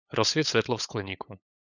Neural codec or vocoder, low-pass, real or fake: codec, 16 kHz, 8 kbps, FunCodec, trained on LibriTTS, 25 frames a second; 7.2 kHz; fake